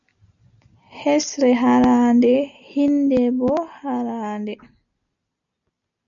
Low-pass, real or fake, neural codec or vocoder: 7.2 kHz; real; none